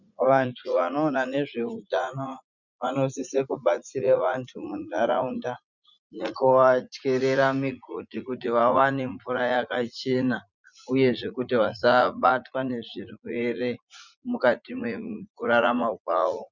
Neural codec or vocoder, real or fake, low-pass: vocoder, 44.1 kHz, 80 mel bands, Vocos; fake; 7.2 kHz